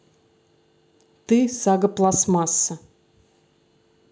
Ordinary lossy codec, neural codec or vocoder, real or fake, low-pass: none; none; real; none